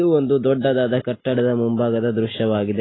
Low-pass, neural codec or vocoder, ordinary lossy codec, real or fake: 7.2 kHz; none; AAC, 16 kbps; real